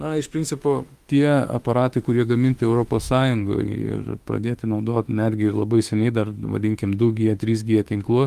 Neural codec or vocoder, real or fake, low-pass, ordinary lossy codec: autoencoder, 48 kHz, 32 numbers a frame, DAC-VAE, trained on Japanese speech; fake; 14.4 kHz; Opus, 32 kbps